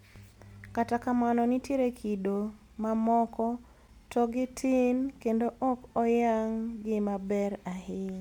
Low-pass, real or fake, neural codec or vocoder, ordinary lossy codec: 19.8 kHz; real; none; MP3, 96 kbps